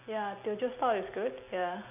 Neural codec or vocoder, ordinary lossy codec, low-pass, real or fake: none; none; 3.6 kHz; real